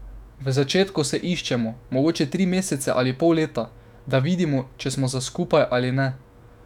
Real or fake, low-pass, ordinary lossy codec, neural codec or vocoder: fake; 19.8 kHz; none; autoencoder, 48 kHz, 128 numbers a frame, DAC-VAE, trained on Japanese speech